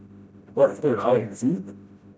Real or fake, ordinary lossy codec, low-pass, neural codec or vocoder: fake; none; none; codec, 16 kHz, 0.5 kbps, FreqCodec, smaller model